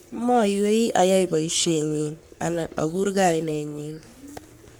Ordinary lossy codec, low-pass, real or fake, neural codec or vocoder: none; none; fake; codec, 44.1 kHz, 3.4 kbps, Pupu-Codec